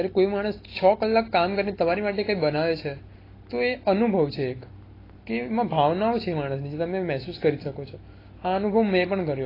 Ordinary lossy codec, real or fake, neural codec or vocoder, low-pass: AAC, 24 kbps; real; none; 5.4 kHz